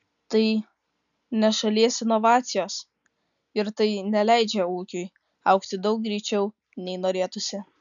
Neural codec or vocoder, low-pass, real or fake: none; 7.2 kHz; real